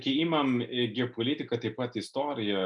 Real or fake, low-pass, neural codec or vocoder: real; 10.8 kHz; none